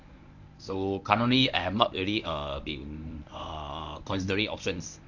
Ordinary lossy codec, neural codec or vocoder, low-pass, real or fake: none; codec, 24 kHz, 0.9 kbps, WavTokenizer, medium speech release version 1; 7.2 kHz; fake